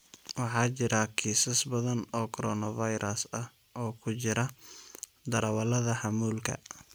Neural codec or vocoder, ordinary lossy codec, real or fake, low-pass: none; none; real; none